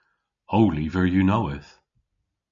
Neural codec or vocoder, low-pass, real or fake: none; 7.2 kHz; real